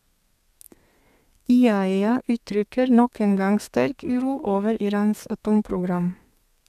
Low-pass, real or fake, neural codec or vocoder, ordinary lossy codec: 14.4 kHz; fake; codec, 32 kHz, 1.9 kbps, SNAC; none